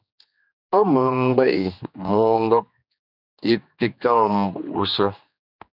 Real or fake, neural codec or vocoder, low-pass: fake; codec, 16 kHz, 2 kbps, X-Codec, HuBERT features, trained on general audio; 5.4 kHz